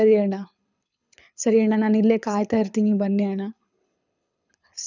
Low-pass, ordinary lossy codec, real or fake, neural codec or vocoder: 7.2 kHz; none; fake; codec, 24 kHz, 6 kbps, HILCodec